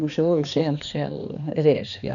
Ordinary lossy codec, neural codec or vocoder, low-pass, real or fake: none; codec, 16 kHz, 2 kbps, X-Codec, HuBERT features, trained on balanced general audio; 7.2 kHz; fake